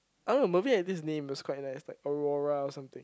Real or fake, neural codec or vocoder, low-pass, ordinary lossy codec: real; none; none; none